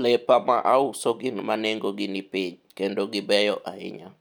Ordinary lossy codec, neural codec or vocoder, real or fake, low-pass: none; none; real; 19.8 kHz